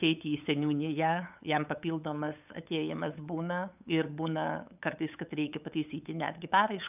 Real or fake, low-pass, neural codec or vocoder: fake; 3.6 kHz; codec, 16 kHz, 8 kbps, FunCodec, trained on LibriTTS, 25 frames a second